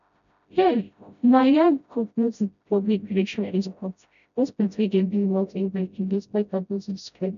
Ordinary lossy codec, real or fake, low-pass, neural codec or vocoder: none; fake; 7.2 kHz; codec, 16 kHz, 0.5 kbps, FreqCodec, smaller model